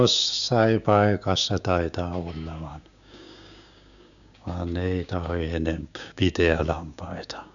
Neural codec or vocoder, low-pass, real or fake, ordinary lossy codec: codec, 16 kHz, 6 kbps, DAC; 7.2 kHz; fake; none